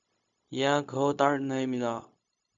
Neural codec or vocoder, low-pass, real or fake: codec, 16 kHz, 0.4 kbps, LongCat-Audio-Codec; 7.2 kHz; fake